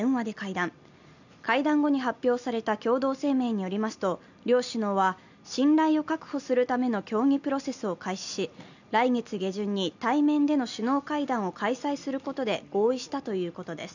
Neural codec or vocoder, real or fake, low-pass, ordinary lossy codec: none; real; 7.2 kHz; none